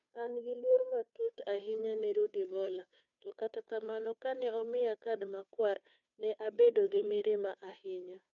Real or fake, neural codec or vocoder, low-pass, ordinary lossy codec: fake; codec, 16 kHz, 2 kbps, FunCodec, trained on Chinese and English, 25 frames a second; 7.2 kHz; none